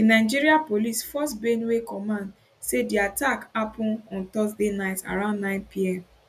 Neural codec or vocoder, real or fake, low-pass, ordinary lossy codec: none; real; 14.4 kHz; none